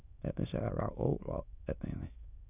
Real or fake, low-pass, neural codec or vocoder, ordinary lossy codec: fake; 3.6 kHz; autoencoder, 22.05 kHz, a latent of 192 numbers a frame, VITS, trained on many speakers; none